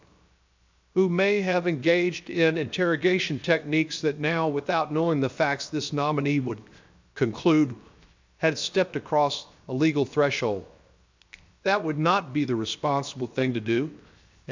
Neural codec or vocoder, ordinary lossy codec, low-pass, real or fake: codec, 16 kHz, 0.7 kbps, FocalCodec; MP3, 64 kbps; 7.2 kHz; fake